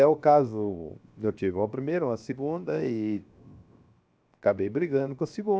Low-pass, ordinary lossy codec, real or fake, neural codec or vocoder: none; none; fake; codec, 16 kHz, 0.7 kbps, FocalCodec